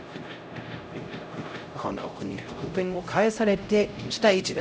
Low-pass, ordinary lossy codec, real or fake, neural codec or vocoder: none; none; fake; codec, 16 kHz, 0.5 kbps, X-Codec, HuBERT features, trained on LibriSpeech